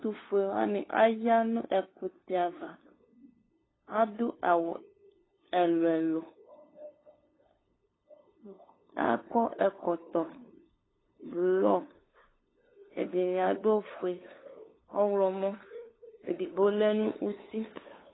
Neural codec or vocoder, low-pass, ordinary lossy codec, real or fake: codec, 16 kHz, 2 kbps, FunCodec, trained on LibriTTS, 25 frames a second; 7.2 kHz; AAC, 16 kbps; fake